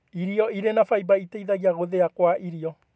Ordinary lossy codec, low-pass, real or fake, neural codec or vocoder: none; none; real; none